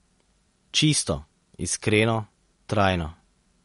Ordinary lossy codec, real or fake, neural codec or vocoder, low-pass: MP3, 48 kbps; real; none; 10.8 kHz